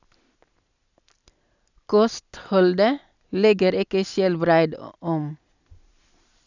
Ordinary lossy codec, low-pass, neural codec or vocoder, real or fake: none; 7.2 kHz; none; real